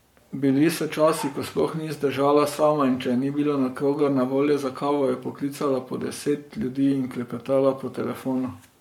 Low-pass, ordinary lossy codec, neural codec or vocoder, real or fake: 19.8 kHz; MP3, 96 kbps; codec, 44.1 kHz, 7.8 kbps, Pupu-Codec; fake